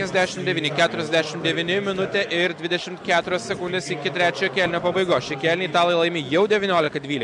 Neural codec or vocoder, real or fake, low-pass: none; real; 10.8 kHz